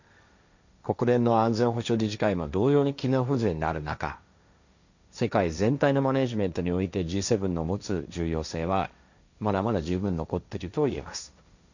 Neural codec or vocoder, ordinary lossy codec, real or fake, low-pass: codec, 16 kHz, 1.1 kbps, Voila-Tokenizer; none; fake; 7.2 kHz